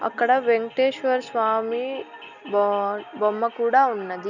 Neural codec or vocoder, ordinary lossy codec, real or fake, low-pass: none; none; real; 7.2 kHz